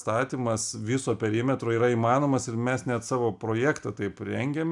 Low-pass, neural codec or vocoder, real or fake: 10.8 kHz; none; real